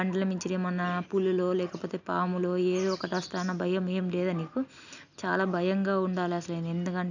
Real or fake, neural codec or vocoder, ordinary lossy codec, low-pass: real; none; none; 7.2 kHz